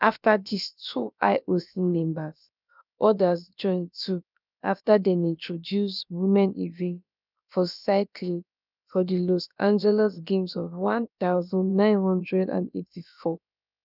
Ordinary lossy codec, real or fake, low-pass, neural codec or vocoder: none; fake; 5.4 kHz; codec, 16 kHz, about 1 kbps, DyCAST, with the encoder's durations